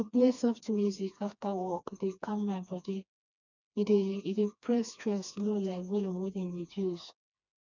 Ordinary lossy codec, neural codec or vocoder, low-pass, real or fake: none; codec, 16 kHz, 2 kbps, FreqCodec, smaller model; 7.2 kHz; fake